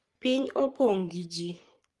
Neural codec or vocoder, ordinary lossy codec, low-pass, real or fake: codec, 44.1 kHz, 3.4 kbps, Pupu-Codec; Opus, 32 kbps; 10.8 kHz; fake